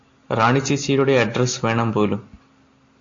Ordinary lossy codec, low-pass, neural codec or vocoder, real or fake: AAC, 48 kbps; 7.2 kHz; none; real